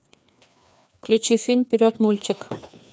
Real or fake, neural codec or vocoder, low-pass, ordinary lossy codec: fake; codec, 16 kHz, 2 kbps, FreqCodec, larger model; none; none